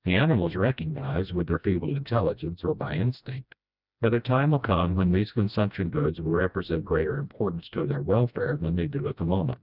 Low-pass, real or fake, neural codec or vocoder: 5.4 kHz; fake; codec, 16 kHz, 1 kbps, FreqCodec, smaller model